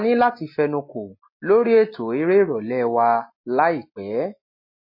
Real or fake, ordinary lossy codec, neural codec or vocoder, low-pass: real; MP3, 24 kbps; none; 5.4 kHz